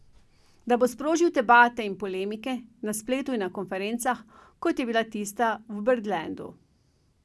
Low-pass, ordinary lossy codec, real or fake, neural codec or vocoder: none; none; real; none